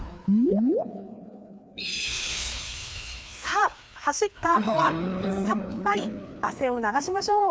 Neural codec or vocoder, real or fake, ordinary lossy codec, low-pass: codec, 16 kHz, 2 kbps, FreqCodec, larger model; fake; none; none